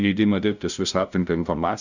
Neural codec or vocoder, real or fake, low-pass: codec, 16 kHz, 0.5 kbps, FunCodec, trained on LibriTTS, 25 frames a second; fake; 7.2 kHz